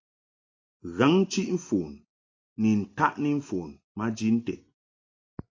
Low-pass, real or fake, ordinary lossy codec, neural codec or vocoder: 7.2 kHz; real; AAC, 32 kbps; none